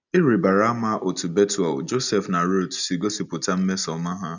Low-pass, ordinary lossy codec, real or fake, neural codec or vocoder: 7.2 kHz; none; real; none